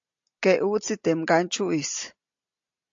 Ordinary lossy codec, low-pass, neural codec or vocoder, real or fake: AAC, 64 kbps; 7.2 kHz; none; real